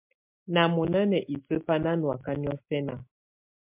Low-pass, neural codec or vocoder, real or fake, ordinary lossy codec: 3.6 kHz; none; real; MP3, 32 kbps